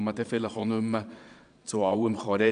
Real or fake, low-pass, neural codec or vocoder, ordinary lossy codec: fake; 9.9 kHz; vocoder, 22.05 kHz, 80 mel bands, Vocos; MP3, 64 kbps